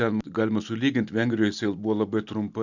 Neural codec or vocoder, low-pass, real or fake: none; 7.2 kHz; real